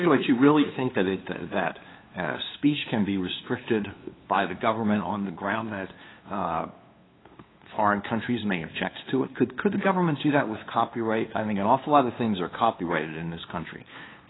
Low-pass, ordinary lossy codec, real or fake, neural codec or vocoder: 7.2 kHz; AAC, 16 kbps; fake; codec, 16 kHz, 2 kbps, FunCodec, trained on LibriTTS, 25 frames a second